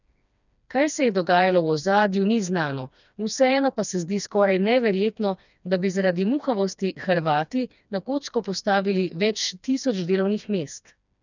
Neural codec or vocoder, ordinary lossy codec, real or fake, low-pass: codec, 16 kHz, 2 kbps, FreqCodec, smaller model; none; fake; 7.2 kHz